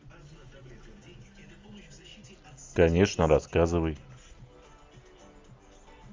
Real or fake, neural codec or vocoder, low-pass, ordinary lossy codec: real; none; 7.2 kHz; Opus, 32 kbps